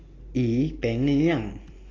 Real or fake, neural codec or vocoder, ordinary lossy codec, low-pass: real; none; AAC, 32 kbps; 7.2 kHz